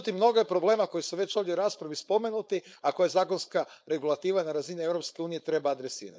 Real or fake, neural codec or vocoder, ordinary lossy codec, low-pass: fake; codec, 16 kHz, 4.8 kbps, FACodec; none; none